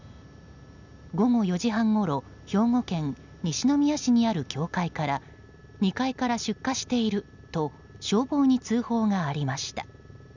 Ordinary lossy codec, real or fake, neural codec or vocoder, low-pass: none; real; none; 7.2 kHz